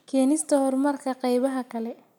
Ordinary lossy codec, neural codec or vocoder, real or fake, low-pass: none; none; real; 19.8 kHz